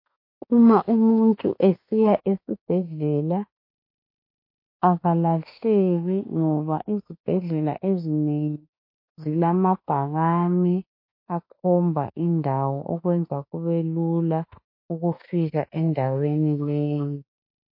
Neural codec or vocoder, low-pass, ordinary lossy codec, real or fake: autoencoder, 48 kHz, 32 numbers a frame, DAC-VAE, trained on Japanese speech; 5.4 kHz; MP3, 32 kbps; fake